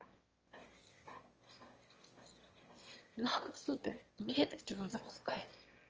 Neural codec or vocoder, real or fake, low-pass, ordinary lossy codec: autoencoder, 22.05 kHz, a latent of 192 numbers a frame, VITS, trained on one speaker; fake; 7.2 kHz; Opus, 24 kbps